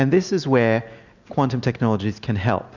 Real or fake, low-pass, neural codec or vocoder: real; 7.2 kHz; none